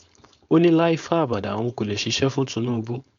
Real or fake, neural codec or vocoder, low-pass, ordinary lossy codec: fake; codec, 16 kHz, 4.8 kbps, FACodec; 7.2 kHz; MP3, 64 kbps